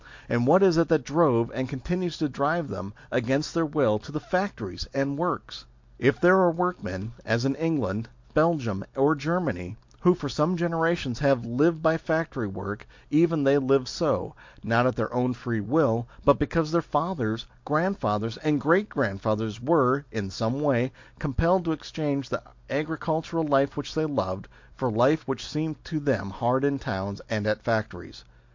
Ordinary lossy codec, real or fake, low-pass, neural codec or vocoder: MP3, 48 kbps; real; 7.2 kHz; none